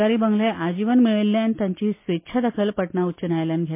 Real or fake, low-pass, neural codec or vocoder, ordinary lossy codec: real; 3.6 kHz; none; MP3, 24 kbps